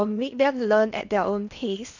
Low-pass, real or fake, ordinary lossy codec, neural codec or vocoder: 7.2 kHz; fake; none; codec, 16 kHz in and 24 kHz out, 0.8 kbps, FocalCodec, streaming, 65536 codes